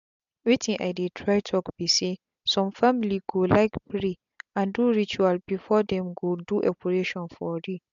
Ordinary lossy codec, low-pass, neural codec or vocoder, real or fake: MP3, 64 kbps; 7.2 kHz; none; real